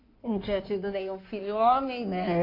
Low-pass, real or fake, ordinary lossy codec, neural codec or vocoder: 5.4 kHz; fake; AAC, 32 kbps; codec, 16 kHz in and 24 kHz out, 2.2 kbps, FireRedTTS-2 codec